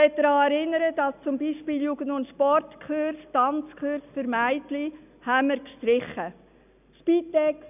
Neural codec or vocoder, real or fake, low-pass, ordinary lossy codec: none; real; 3.6 kHz; none